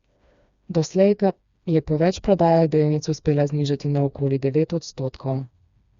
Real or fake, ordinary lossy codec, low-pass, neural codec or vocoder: fake; Opus, 64 kbps; 7.2 kHz; codec, 16 kHz, 2 kbps, FreqCodec, smaller model